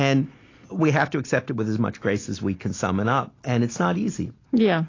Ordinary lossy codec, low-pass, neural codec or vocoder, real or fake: AAC, 32 kbps; 7.2 kHz; none; real